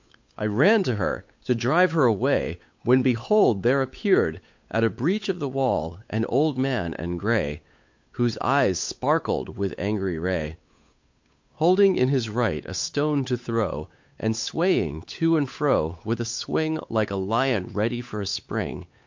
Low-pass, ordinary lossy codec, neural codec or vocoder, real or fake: 7.2 kHz; MP3, 48 kbps; codec, 16 kHz, 8 kbps, FunCodec, trained on Chinese and English, 25 frames a second; fake